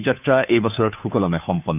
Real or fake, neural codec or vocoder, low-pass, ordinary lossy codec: fake; codec, 16 kHz, 2 kbps, FunCodec, trained on Chinese and English, 25 frames a second; 3.6 kHz; none